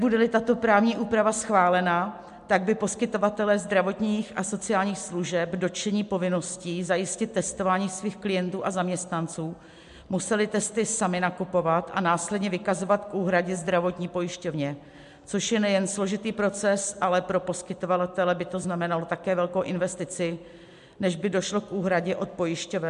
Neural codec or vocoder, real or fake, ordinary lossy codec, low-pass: none; real; MP3, 64 kbps; 10.8 kHz